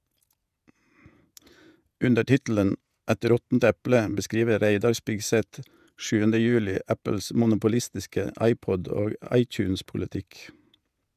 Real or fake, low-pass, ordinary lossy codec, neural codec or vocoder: fake; 14.4 kHz; none; vocoder, 48 kHz, 128 mel bands, Vocos